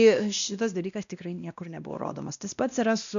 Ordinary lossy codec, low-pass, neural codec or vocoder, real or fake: MP3, 96 kbps; 7.2 kHz; codec, 16 kHz, 1 kbps, X-Codec, WavLM features, trained on Multilingual LibriSpeech; fake